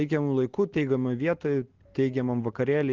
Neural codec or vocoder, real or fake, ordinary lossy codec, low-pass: none; real; Opus, 16 kbps; 7.2 kHz